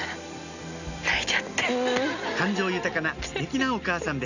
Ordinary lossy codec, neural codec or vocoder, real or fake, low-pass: none; none; real; 7.2 kHz